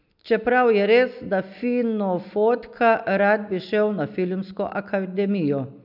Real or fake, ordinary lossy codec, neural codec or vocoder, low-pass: real; none; none; 5.4 kHz